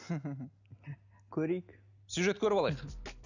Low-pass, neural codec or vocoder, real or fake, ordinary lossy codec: 7.2 kHz; none; real; none